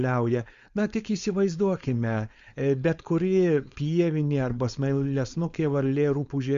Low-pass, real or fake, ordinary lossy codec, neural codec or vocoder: 7.2 kHz; fake; Opus, 64 kbps; codec, 16 kHz, 4.8 kbps, FACodec